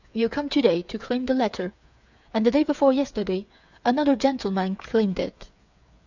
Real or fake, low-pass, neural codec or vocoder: fake; 7.2 kHz; codec, 16 kHz, 8 kbps, FreqCodec, smaller model